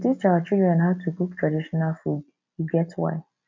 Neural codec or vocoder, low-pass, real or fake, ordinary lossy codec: none; 7.2 kHz; real; MP3, 64 kbps